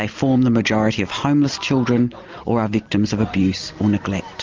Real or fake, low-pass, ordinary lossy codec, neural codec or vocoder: real; 7.2 kHz; Opus, 32 kbps; none